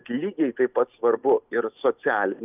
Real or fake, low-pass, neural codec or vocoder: real; 3.6 kHz; none